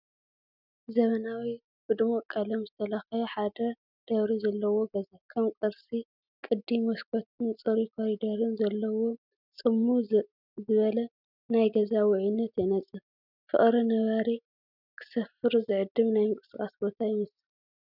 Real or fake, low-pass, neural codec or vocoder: real; 5.4 kHz; none